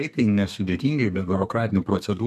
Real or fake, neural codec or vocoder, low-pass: fake; codec, 32 kHz, 1.9 kbps, SNAC; 14.4 kHz